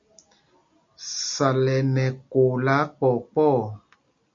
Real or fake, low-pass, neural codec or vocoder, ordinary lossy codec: real; 7.2 kHz; none; MP3, 48 kbps